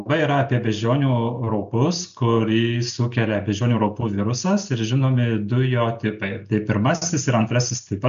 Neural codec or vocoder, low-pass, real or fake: none; 7.2 kHz; real